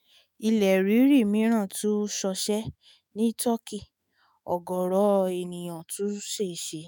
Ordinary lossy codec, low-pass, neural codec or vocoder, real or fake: none; none; autoencoder, 48 kHz, 128 numbers a frame, DAC-VAE, trained on Japanese speech; fake